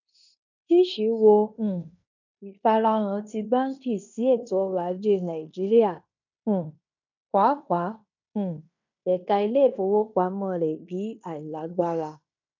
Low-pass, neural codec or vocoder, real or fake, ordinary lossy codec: 7.2 kHz; codec, 16 kHz in and 24 kHz out, 0.9 kbps, LongCat-Audio-Codec, fine tuned four codebook decoder; fake; none